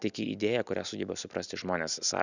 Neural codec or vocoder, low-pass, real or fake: none; 7.2 kHz; real